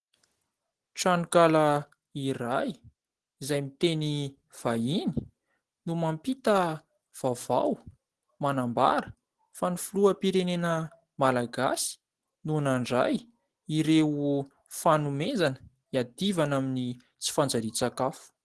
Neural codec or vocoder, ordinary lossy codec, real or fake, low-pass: none; Opus, 16 kbps; real; 10.8 kHz